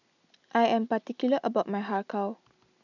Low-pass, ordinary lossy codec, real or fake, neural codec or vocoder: 7.2 kHz; none; real; none